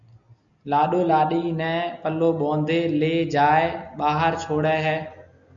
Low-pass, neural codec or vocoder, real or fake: 7.2 kHz; none; real